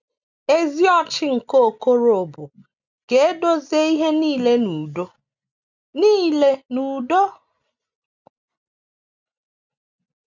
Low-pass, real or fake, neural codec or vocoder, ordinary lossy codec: 7.2 kHz; real; none; none